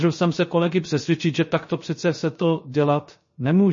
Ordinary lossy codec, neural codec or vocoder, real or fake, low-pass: MP3, 32 kbps; codec, 16 kHz, 0.3 kbps, FocalCodec; fake; 7.2 kHz